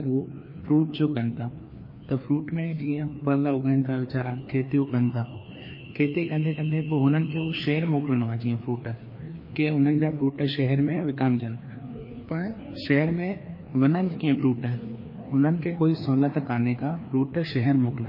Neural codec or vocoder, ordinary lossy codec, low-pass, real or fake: codec, 16 kHz, 2 kbps, FreqCodec, larger model; MP3, 24 kbps; 5.4 kHz; fake